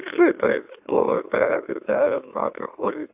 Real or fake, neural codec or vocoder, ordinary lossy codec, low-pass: fake; autoencoder, 44.1 kHz, a latent of 192 numbers a frame, MeloTTS; AAC, 32 kbps; 3.6 kHz